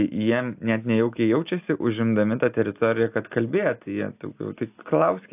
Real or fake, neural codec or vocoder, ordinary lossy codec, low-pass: real; none; AAC, 32 kbps; 3.6 kHz